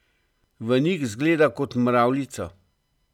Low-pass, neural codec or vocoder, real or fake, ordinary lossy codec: 19.8 kHz; none; real; none